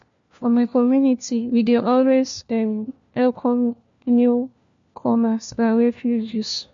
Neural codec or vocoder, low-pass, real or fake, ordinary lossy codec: codec, 16 kHz, 1 kbps, FunCodec, trained on LibriTTS, 50 frames a second; 7.2 kHz; fake; MP3, 48 kbps